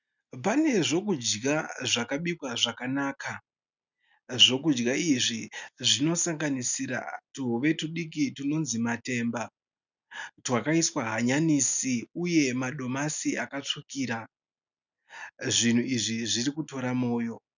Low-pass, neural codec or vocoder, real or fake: 7.2 kHz; none; real